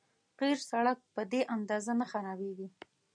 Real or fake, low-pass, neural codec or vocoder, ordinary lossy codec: real; 9.9 kHz; none; MP3, 96 kbps